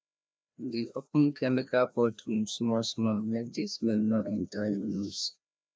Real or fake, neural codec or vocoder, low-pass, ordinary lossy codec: fake; codec, 16 kHz, 1 kbps, FreqCodec, larger model; none; none